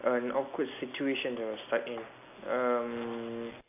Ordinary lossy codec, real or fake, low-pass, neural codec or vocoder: none; real; 3.6 kHz; none